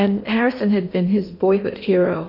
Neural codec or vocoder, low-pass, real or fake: codec, 16 kHz in and 24 kHz out, 0.8 kbps, FocalCodec, streaming, 65536 codes; 5.4 kHz; fake